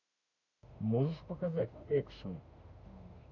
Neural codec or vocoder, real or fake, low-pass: autoencoder, 48 kHz, 32 numbers a frame, DAC-VAE, trained on Japanese speech; fake; 7.2 kHz